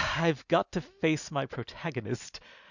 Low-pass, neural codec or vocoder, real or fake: 7.2 kHz; none; real